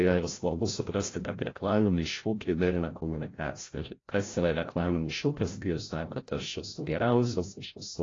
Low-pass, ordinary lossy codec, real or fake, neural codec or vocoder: 7.2 kHz; AAC, 32 kbps; fake; codec, 16 kHz, 0.5 kbps, FreqCodec, larger model